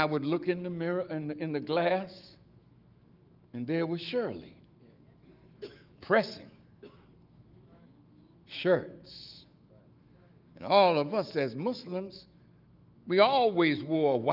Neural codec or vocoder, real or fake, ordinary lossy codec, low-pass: autoencoder, 48 kHz, 128 numbers a frame, DAC-VAE, trained on Japanese speech; fake; Opus, 32 kbps; 5.4 kHz